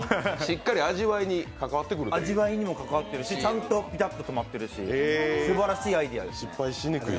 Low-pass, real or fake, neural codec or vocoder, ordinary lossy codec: none; real; none; none